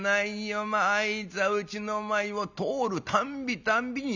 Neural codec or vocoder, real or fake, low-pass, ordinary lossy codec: none; real; 7.2 kHz; none